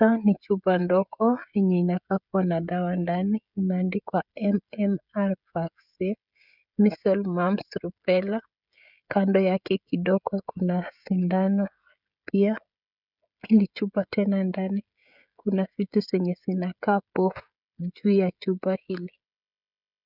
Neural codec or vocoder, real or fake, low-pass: codec, 16 kHz, 16 kbps, FreqCodec, smaller model; fake; 5.4 kHz